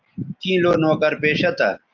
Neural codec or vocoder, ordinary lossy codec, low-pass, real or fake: none; Opus, 32 kbps; 7.2 kHz; real